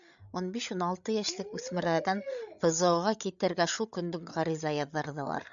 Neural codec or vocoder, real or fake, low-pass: codec, 16 kHz, 8 kbps, FreqCodec, larger model; fake; 7.2 kHz